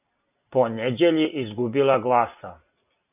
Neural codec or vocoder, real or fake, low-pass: none; real; 3.6 kHz